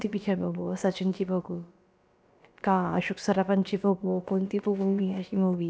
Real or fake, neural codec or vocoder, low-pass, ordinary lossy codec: fake; codec, 16 kHz, about 1 kbps, DyCAST, with the encoder's durations; none; none